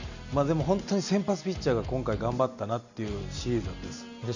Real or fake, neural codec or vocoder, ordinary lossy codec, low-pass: real; none; none; 7.2 kHz